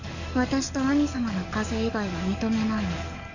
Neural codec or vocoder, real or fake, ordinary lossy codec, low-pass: codec, 44.1 kHz, 7.8 kbps, DAC; fake; none; 7.2 kHz